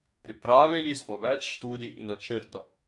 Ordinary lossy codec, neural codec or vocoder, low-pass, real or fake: AAC, 64 kbps; codec, 44.1 kHz, 2.6 kbps, DAC; 10.8 kHz; fake